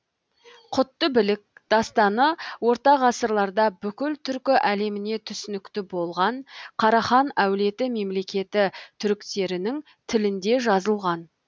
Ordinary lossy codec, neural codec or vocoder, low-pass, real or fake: none; none; none; real